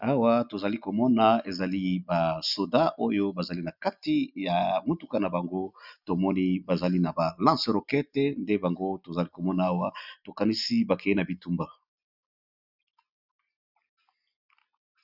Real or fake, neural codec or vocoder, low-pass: real; none; 5.4 kHz